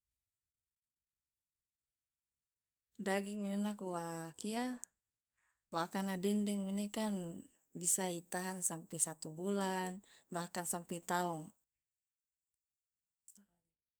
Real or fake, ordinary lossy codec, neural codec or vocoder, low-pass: fake; none; codec, 44.1 kHz, 2.6 kbps, SNAC; none